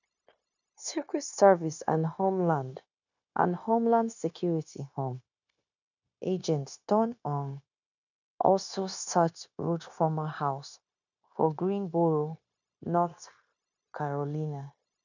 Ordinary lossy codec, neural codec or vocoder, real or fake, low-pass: none; codec, 16 kHz, 0.9 kbps, LongCat-Audio-Codec; fake; 7.2 kHz